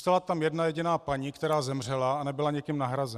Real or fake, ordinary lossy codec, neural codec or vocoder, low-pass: real; Opus, 64 kbps; none; 14.4 kHz